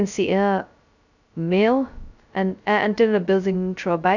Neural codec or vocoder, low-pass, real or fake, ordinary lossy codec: codec, 16 kHz, 0.2 kbps, FocalCodec; 7.2 kHz; fake; none